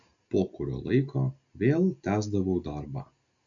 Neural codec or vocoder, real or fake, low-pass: none; real; 7.2 kHz